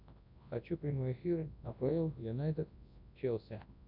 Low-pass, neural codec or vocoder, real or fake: 5.4 kHz; codec, 24 kHz, 0.9 kbps, WavTokenizer, large speech release; fake